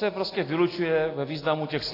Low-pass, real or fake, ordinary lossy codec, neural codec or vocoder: 5.4 kHz; real; AAC, 24 kbps; none